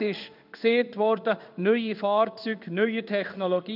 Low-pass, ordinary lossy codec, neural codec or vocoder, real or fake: 5.4 kHz; none; codec, 16 kHz in and 24 kHz out, 1 kbps, XY-Tokenizer; fake